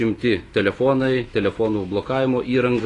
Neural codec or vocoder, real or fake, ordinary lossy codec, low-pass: autoencoder, 48 kHz, 128 numbers a frame, DAC-VAE, trained on Japanese speech; fake; AAC, 32 kbps; 10.8 kHz